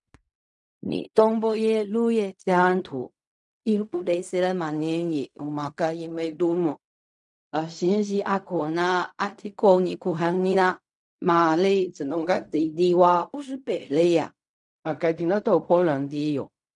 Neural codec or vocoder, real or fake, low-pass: codec, 16 kHz in and 24 kHz out, 0.4 kbps, LongCat-Audio-Codec, fine tuned four codebook decoder; fake; 10.8 kHz